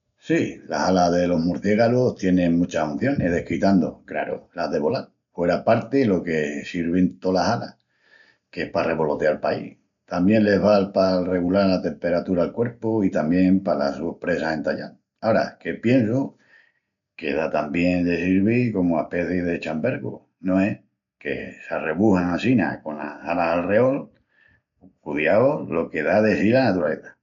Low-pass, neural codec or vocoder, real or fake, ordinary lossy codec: 7.2 kHz; none; real; none